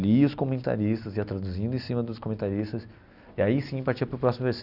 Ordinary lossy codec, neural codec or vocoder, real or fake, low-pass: Opus, 64 kbps; none; real; 5.4 kHz